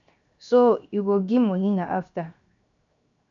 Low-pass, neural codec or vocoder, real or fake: 7.2 kHz; codec, 16 kHz, 0.7 kbps, FocalCodec; fake